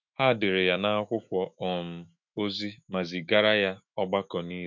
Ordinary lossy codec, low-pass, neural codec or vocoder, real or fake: MP3, 64 kbps; 7.2 kHz; autoencoder, 48 kHz, 128 numbers a frame, DAC-VAE, trained on Japanese speech; fake